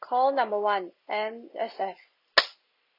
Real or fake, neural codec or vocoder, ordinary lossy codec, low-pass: real; none; none; 5.4 kHz